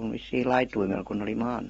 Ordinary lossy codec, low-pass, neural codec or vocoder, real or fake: AAC, 24 kbps; 14.4 kHz; none; real